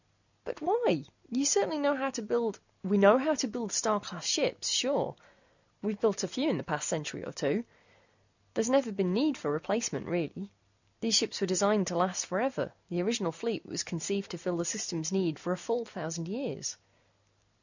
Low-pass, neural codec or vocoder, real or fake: 7.2 kHz; none; real